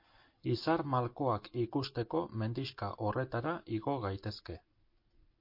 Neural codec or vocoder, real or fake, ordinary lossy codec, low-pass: none; real; MP3, 32 kbps; 5.4 kHz